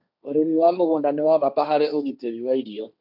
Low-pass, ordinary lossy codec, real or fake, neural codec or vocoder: 5.4 kHz; none; fake; codec, 16 kHz, 1.1 kbps, Voila-Tokenizer